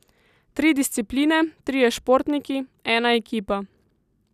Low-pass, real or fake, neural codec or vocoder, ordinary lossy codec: 14.4 kHz; real; none; none